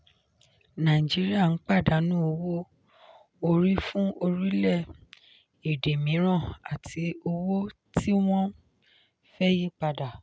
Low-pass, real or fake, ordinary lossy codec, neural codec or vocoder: none; real; none; none